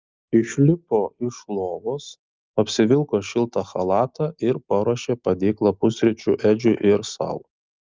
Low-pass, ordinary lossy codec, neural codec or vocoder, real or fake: 7.2 kHz; Opus, 24 kbps; none; real